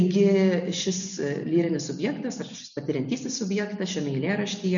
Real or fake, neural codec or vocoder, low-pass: real; none; 7.2 kHz